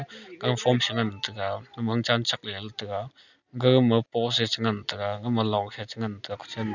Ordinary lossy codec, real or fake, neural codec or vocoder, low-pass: none; real; none; 7.2 kHz